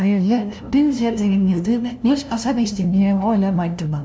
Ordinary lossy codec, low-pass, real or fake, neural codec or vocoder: none; none; fake; codec, 16 kHz, 0.5 kbps, FunCodec, trained on LibriTTS, 25 frames a second